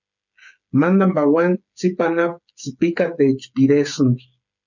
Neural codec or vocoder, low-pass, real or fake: codec, 16 kHz, 8 kbps, FreqCodec, smaller model; 7.2 kHz; fake